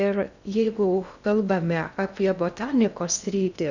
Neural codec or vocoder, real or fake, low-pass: codec, 16 kHz in and 24 kHz out, 0.6 kbps, FocalCodec, streaming, 2048 codes; fake; 7.2 kHz